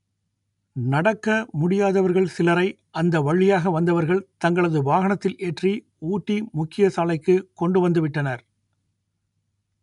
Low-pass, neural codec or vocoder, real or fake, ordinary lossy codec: 10.8 kHz; none; real; none